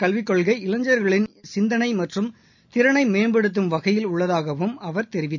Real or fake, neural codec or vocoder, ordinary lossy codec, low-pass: real; none; none; 7.2 kHz